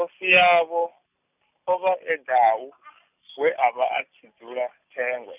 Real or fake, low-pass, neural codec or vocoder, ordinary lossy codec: real; 3.6 kHz; none; none